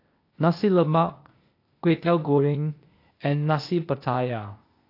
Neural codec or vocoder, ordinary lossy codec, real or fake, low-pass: codec, 16 kHz, 0.8 kbps, ZipCodec; AAC, 32 kbps; fake; 5.4 kHz